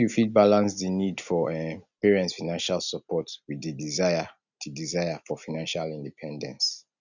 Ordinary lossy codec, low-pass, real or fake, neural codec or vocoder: none; 7.2 kHz; real; none